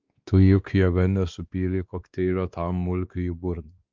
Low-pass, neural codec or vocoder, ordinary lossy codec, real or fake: 7.2 kHz; codec, 16 kHz, 2 kbps, X-Codec, WavLM features, trained on Multilingual LibriSpeech; Opus, 24 kbps; fake